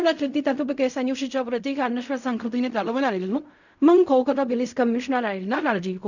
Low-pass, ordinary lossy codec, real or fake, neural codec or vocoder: 7.2 kHz; none; fake; codec, 16 kHz in and 24 kHz out, 0.4 kbps, LongCat-Audio-Codec, fine tuned four codebook decoder